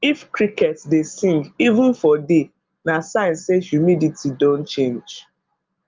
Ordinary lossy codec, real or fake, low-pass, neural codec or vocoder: Opus, 32 kbps; real; 7.2 kHz; none